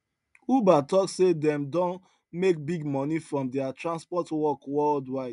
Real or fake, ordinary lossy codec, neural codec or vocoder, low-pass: real; none; none; 10.8 kHz